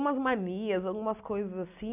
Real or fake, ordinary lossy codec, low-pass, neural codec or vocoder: real; none; 3.6 kHz; none